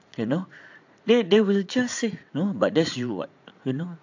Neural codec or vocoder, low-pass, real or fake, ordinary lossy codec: vocoder, 44.1 kHz, 80 mel bands, Vocos; 7.2 kHz; fake; AAC, 48 kbps